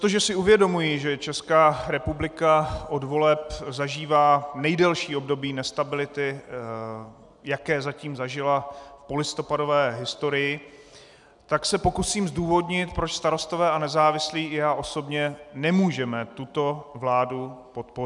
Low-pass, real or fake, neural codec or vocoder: 10.8 kHz; real; none